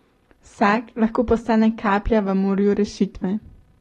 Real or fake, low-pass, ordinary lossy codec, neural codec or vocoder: fake; 19.8 kHz; AAC, 32 kbps; vocoder, 44.1 kHz, 128 mel bands every 512 samples, BigVGAN v2